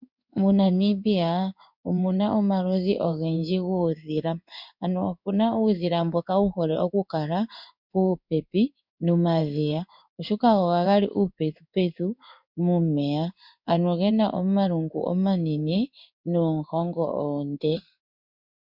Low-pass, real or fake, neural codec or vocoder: 5.4 kHz; fake; codec, 16 kHz in and 24 kHz out, 1 kbps, XY-Tokenizer